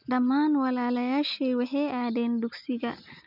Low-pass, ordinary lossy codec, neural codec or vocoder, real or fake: 5.4 kHz; none; none; real